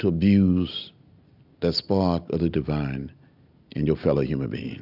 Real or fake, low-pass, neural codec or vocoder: real; 5.4 kHz; none